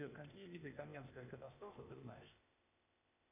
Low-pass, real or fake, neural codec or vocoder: 3.6 kHz; fake; codec, 16 kHz, 0.8 kbps, ZipCodec